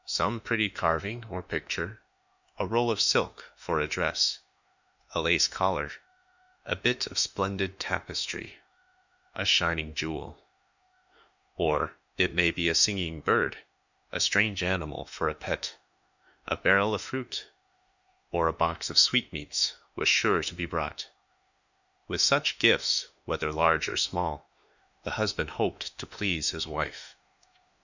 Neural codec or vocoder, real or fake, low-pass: autoencoder, 48 kHz, 32 numbers a frame, DAC-VAE, trained on Japanese speech; fake; 7.2 kHz